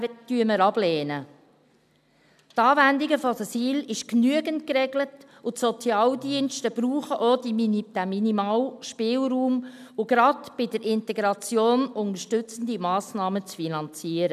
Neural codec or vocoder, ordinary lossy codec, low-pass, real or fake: none; none; 14.4 kHz; real